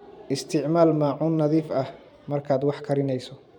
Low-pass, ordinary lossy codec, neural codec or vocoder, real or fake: 19.8 kHz; none; vocoder, 44.1 kHz, 128 mel bands every 512 samples, BigVGAN v2; fake